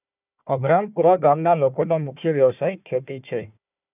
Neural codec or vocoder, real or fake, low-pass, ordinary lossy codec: codec, 16 kHz, 1 kbps, FunCodec, trained on Chinese and English, 50 frames a second; fake; 3.6 kHz; none